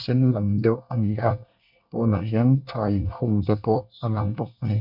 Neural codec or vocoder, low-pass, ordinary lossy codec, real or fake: codec, 24 kHz, 1 kbps, SNAC; 5.4 kHz; MP3, 48 kbps; fake